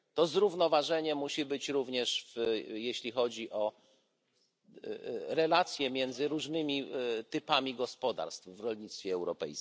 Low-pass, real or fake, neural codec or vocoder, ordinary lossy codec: none; real; none; none